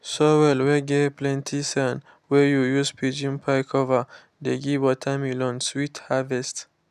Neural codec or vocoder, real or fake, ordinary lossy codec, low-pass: none; real; none; 14.4 kHz